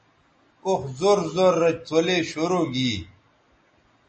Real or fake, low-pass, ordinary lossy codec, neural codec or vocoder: real; 10.8 kHz; MP3, 32 kbps; none